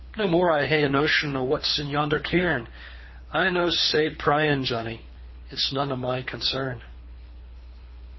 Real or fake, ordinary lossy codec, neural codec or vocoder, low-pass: fake; MP3, 24 kbps; codec, 24 kHz, 3 kbps, HILCodec; 7.2 kHz